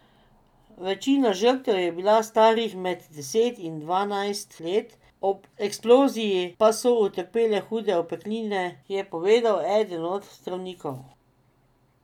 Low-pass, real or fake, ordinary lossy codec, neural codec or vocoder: 19.8 kHz; real; none; none